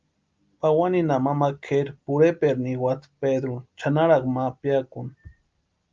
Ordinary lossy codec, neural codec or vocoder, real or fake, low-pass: Opus, 24 kbps; none; real; 7.2 kHz